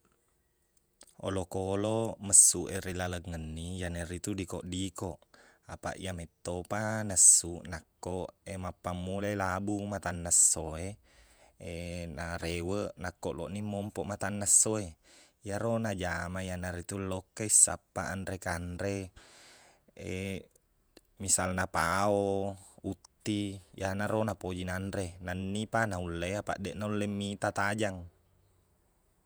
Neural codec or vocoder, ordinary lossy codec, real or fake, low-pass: vocoder, 48 kHz, 128 mel bands, Vocos; none; fake; none